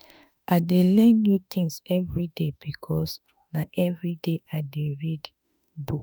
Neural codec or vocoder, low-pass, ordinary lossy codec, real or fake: autoencoder, 48 kHz, 32 numbers a frame, DAC-VAE, trained on Japanese speech; none; none; fake